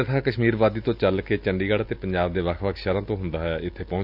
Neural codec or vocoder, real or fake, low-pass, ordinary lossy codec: none; real; 5.4 kHz; none